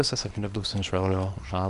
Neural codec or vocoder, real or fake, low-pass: codec, 24 kHz, 0.9 kbps, WavTokenizer, small release; fake; 10.8 kHz